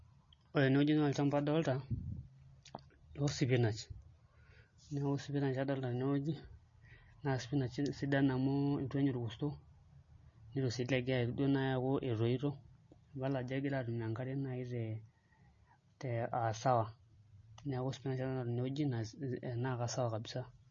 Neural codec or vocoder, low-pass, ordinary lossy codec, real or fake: none; 7.2 kHz; MP3, 32 kbps; real